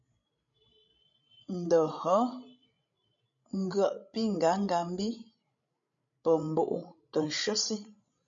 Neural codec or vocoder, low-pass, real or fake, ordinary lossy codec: codec, 16 kHz, 16 kbps, FreqCodec, larger model; 7.2 kHz; fake; MP3, 64 kbps